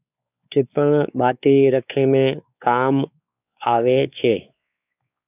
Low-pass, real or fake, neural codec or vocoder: 3.6 kHz; fake; codec, 16 kHz, 4 kbps, X-Codec, WavLM features, trained on Multilingual LibriSpeech